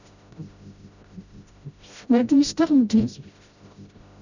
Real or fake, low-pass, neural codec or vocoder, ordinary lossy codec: fake; 7.2 kHz; codec, 16 kHz, 0.5 kbps, FreqCodec, smaller model; none